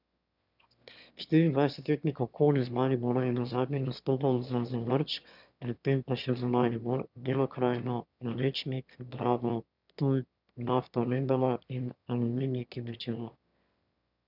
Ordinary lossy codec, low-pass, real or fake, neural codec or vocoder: none; 5.4 kHz; fake; autoencoder, 22.05 kHz, a latent of 192 numbers a frame, VITS, trained on one speaker